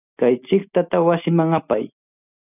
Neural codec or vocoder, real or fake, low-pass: none; real; 3.6 kHz